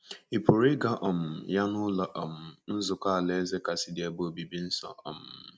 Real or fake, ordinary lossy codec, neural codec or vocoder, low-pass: real; none; none; none